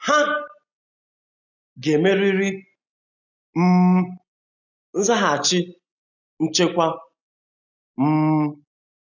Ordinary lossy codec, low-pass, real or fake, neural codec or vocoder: none; 7.2 kHz; real; none